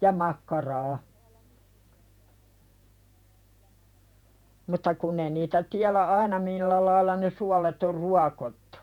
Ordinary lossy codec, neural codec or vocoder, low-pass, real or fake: none; vocoder, 48 kHz, 128 mel bands, Vocos; 19.8 kHz; fake